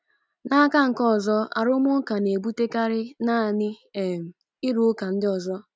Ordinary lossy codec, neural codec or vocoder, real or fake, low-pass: none; none; real; none